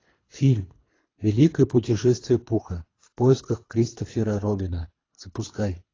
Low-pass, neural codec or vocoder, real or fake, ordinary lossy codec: 7.2 kHz; codec, 24 kHz, 3 kbps, HILCodec; fake; AAC, 32 kbps